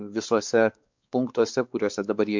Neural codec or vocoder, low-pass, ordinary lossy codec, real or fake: codec, 16 kHz, 4 kbps, X-Codec, WavLM features, trained on Multilingual LibriSpeech; 7.2 kHz; MP3, 64 kbps; fake